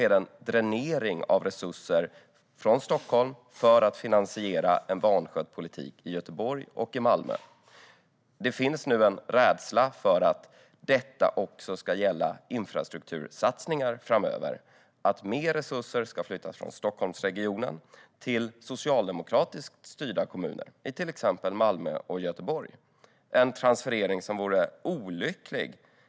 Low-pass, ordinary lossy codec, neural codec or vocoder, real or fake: none; none; none; real